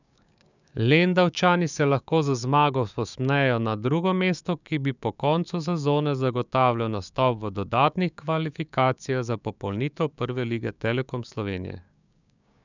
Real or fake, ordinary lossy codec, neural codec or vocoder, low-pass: fake; none; codec, 16 kHz, 6 kbps, DAC; 7.2 kHz